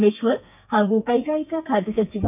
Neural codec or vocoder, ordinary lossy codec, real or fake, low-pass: codec, 32 kHz, 1.9 kbps, SNAC; none; fake; 3.6 kHz